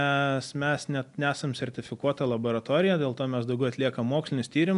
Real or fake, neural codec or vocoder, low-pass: real; none; 10.8 kHz